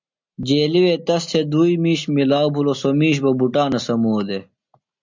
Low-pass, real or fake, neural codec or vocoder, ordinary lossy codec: 7.2 kHz; real; none; MP3, 64 kbps